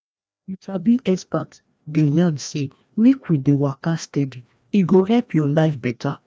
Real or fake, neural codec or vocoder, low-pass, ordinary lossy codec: fake; codec, 16 kHz, 1 kbps, FreqCodec, larger model; none; none